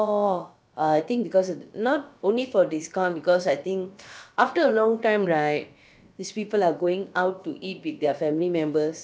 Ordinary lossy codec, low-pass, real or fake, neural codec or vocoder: none; none; fake; codec, 16 kHz, about 1 kbps, DyCAST, with the encoder's durations